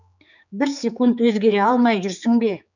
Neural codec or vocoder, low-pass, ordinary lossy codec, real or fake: codec, 16 kHz, 4 kbps, X-Codec, HuBERT features, trained on general audio; 7.2 kHz; none; fake